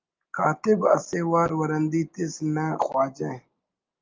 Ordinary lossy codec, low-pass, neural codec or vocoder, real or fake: Opus, 32 kbps; 7.2 kHz; none; real